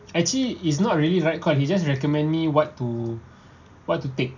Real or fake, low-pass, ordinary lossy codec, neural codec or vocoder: real; 7.2 kHz; none; none